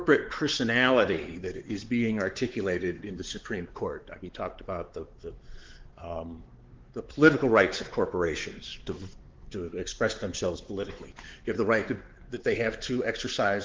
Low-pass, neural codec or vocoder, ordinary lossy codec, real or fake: 7.2 kHz; codec, 16 kHz, 4 kbps, X-Codec, WavLM features, trained on Multilingual LibriSpeech; Opus, 16 kbps; fake